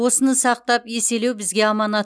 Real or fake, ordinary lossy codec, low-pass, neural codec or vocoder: real; none; none; none